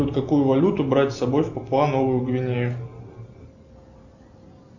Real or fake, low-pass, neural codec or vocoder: real; 7.2 kHz; none